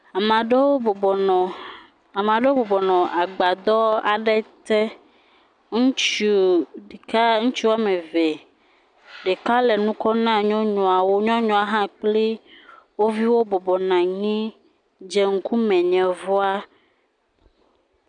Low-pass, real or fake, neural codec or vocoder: 9.9 kHz; real; none